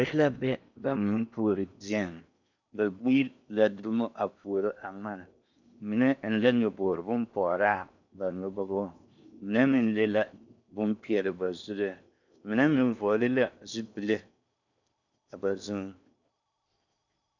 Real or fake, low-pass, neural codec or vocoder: fake; 7.2 kHz; codec, 16 kHz in and 24 kHz out, 0.8 kbps, FocalCodec, streaming, 65536 codes